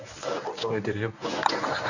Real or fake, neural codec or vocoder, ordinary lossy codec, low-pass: fake; codec, 24 kHz, 0.9 kbps, WavTokenizer, medium speech release version 1; none; 7.2 kHz